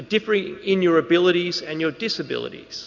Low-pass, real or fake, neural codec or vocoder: 7.2 kHz; real; none